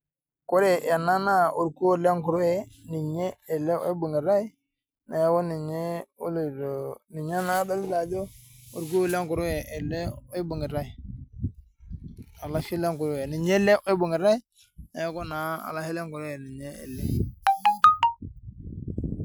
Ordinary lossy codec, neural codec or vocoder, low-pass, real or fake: none; none; none; real